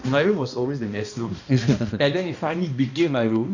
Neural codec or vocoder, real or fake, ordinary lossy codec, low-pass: codec, 16 kHz, 1 kbps, X-Codec, HuBERT features, trained on balanced general audio; fake; none; 7.2 kHz